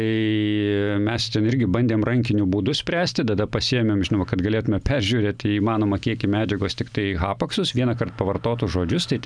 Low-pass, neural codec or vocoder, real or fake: 9.9 kHz; none; real